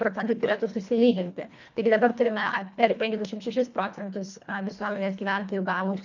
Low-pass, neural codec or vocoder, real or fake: 7.2 kHz; codec, 24 kHz, 1.5 kbps, HILCodec; fake